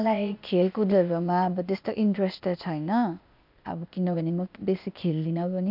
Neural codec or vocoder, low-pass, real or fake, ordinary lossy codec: codec, 16 kHz, 0.8 kbps, ZipCodec; 5.4 kHz; fake; none